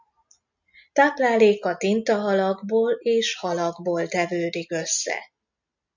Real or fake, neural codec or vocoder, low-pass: real; none; 7.2 kHz